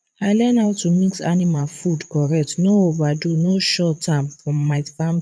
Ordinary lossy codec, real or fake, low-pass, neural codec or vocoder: none; fake; none; vocoder, 22.05 kHz, 80 mel bands, Vocos